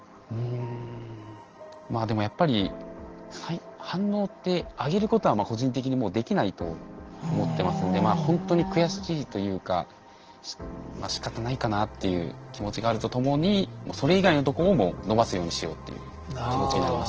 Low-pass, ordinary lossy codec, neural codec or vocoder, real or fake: 7.2 kHz; Opus, 16 kbps; none; real